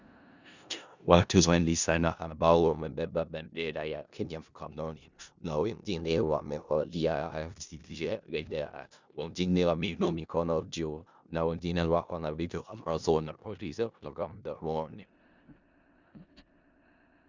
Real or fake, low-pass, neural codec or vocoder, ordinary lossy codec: fake; 7.2 kHz; codec, 16 kHz in and 24 kHz out, 0.4 kbps, LongCat-Audio-Codec, four codebook decoder; Opus, 64 kbps